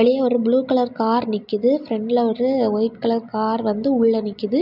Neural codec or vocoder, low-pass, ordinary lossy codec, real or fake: none; 5.4 kHz; none; real